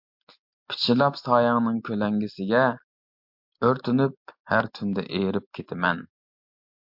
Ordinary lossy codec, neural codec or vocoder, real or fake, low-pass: MP3, 48 kbps; none; real; 5.4 kHz